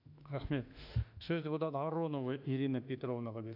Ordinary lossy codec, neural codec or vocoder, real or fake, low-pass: none; autoencoder, 48 kHz, 32 numbers a frame, DAC-VAE, trained on Japanese speech; fake; 5.4 kHz